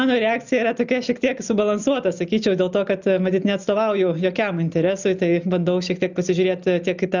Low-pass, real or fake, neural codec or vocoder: 7.2 kHz; real; none